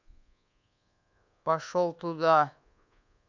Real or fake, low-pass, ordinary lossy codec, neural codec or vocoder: fake; 7.2 kHz; none; codec, 24 kHz, 1.2 kbps, DualCodec